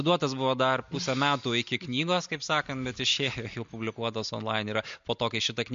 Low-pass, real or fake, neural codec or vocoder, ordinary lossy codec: 7.2 kHz; real; none; MP3, 48 kbps